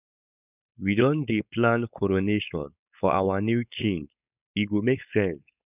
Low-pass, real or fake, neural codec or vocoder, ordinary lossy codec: 3.6 kHz; fake; codec, 16 kHz, 4.8 kbps, FACodec; none